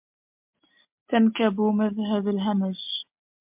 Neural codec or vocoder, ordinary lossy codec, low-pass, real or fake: none; MP3, 32 kbps; 3.6 kHz; real